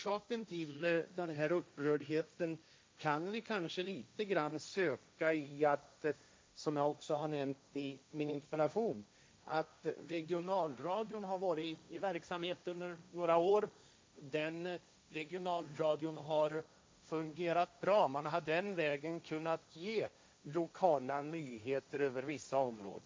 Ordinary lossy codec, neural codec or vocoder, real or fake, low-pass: none; codec, 16 kHz, 1.1 kbps, Voila-Tokenizer; fake; none